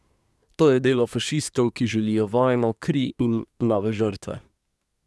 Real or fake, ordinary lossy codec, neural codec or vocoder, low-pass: fake; none; codec, 24 kHz, 1 kbps, SNAC; none